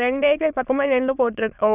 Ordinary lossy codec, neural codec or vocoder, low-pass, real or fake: none; autoencoder, 22.05 kHz, a latent of 192 numbers a frame, VITS, trained on many speakers; 3.6 kHz; fake